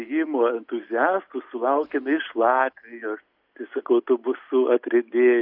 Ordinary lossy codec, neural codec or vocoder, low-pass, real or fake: AAC, 48 kbps; none; 5.4 kHz; real